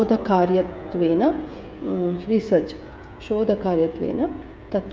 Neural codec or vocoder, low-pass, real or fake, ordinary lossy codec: codec, 16 kHz, 16 kbps, FreqCodec, smaller model; none; fake; none